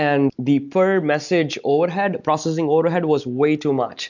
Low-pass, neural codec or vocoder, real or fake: 7.2 kHz; none; real